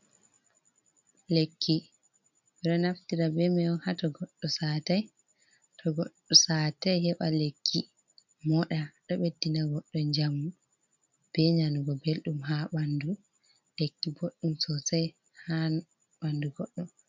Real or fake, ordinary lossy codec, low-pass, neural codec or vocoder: real; MP3, 64 kbps; 7.2 kHz; none